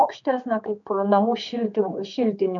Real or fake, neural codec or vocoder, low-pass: fake; codec, 16 kHz, 4 kbps, X-Codec, HuBERT features, trained on general audio; 7.2 kHz